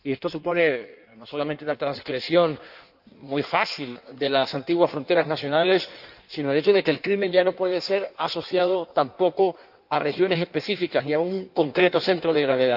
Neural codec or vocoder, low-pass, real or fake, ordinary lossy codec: codec, 16 kHz in and 24 kHz out, 1.1 kbps, FireRedTTS-2 codec; 5.4 kHz; fake; none